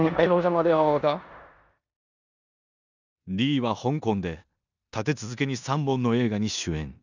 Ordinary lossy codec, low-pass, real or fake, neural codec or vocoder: none; 7.2 kHz; fake; codec, 16 kHz in and 24 kHz out, 0.9 kbps, LongCat-Audio-Codec, four codebook decoder